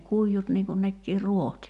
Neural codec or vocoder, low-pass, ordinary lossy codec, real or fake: none; 10.8 kHz; none; real